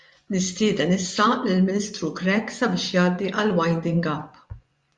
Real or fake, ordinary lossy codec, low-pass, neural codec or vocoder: fake; Opus, 64 kbps; 10.8 kHz; vocoder, 24 kHz, 100 mel bands, Vocos